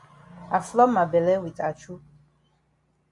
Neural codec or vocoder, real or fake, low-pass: none; real; 10.8 kHz